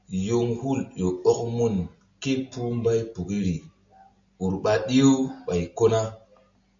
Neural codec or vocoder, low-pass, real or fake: none; 7.2 kHz; real